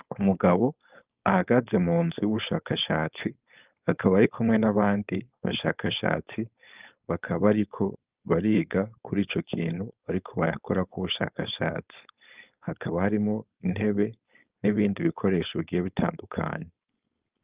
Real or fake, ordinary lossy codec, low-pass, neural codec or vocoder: fake; Opus, 24 kbps; 3.6 kHz; codec, 16 kHz, 4.8 kbps, FACodec